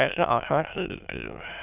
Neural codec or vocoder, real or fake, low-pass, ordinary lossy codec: autoencoder, 22.05 kHz, a latent of 192 numbers a frame, VITS, trained on many speakers; fake; 3.6 kHz; none